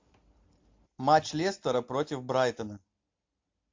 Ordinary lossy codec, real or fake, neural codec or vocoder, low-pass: MP3, 48 kbps; real; none; 7.2 kHz